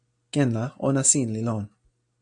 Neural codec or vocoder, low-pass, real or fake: none; 9.9 kHz; real